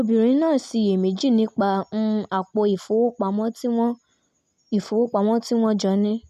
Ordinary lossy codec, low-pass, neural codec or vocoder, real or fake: none; 14.4 kHz; none; real